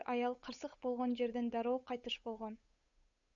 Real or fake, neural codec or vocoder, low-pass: fake; codec, 16 kHz, 8 kbps, FunCodec, trained on Chinese and English, 25 frames a second; 7.2 kHz